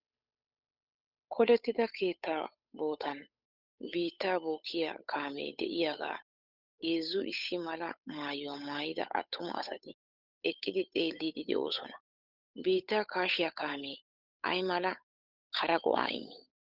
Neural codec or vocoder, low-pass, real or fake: codec, 16 kHz, 8 kbps, FunCodec, trained on Chinese and English, 25 frames a second; 5.4 kHz; fake